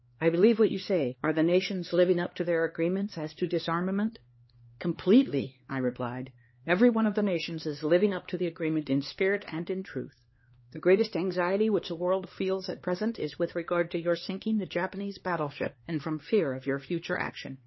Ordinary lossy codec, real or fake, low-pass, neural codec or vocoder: MP3, 24 kbps; fake; 7.2 kHz; codec, 16 kHz, 2 kbps, X-Codec, HuBERT features, trained on LibriSpeech